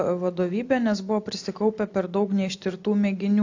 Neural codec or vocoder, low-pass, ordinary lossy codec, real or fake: none; 7.2 kHz; AAC, 48 kbps; real